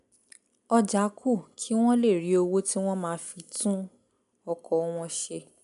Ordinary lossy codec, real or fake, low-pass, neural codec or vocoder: none; real; 10.8 kHz; none